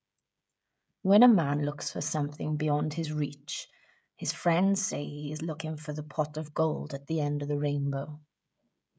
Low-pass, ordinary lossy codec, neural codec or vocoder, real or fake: none; none; codec, 16 kHz, 16 kbps, FreqCodec, smaller model; fake